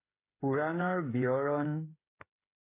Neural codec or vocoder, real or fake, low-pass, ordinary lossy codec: codec, 16 kHz, 8 kbps, FreqCodec, smaller model; fake; 3.6 kHz; AAC, 24 kbps